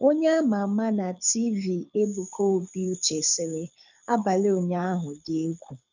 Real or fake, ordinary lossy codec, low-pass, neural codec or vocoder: fake; none; 7.2 kHz; codec, 24 kHz, 6 kbps, HILCodec